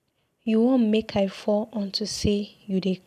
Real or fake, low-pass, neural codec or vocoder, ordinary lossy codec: real; 14.4 kHz; none; none